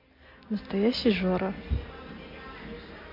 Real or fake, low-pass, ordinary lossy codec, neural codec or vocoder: real; 5.4 kHz; MP3, 32 kbps; none